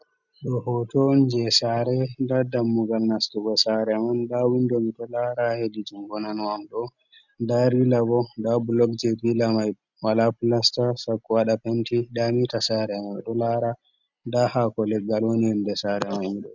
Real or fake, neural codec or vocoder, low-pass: real; none; 7.2 kHz